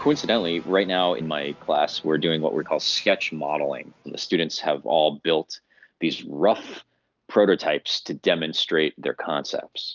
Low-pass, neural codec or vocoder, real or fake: 7.2 kHz; none; real